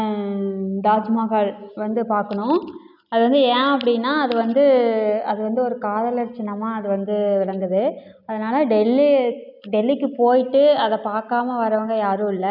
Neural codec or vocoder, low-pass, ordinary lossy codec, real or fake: none; 5.4 kHz; none; real